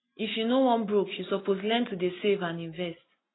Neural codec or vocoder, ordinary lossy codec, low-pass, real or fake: none; AAC, 16 kbps; 7.2 kHz; real